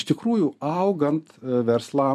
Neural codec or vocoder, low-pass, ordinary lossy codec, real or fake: vocoder, 44.1 kHz, 128 mel bands every 512 samples, BigVGAN v2; 14.4 kHz; MP3, 64 kbps; fake